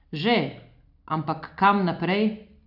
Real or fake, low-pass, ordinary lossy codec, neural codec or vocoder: real; 5.4 kHz; none; none